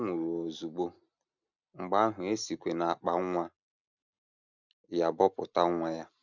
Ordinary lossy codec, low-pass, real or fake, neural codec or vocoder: none; 7.2 kHz; real; none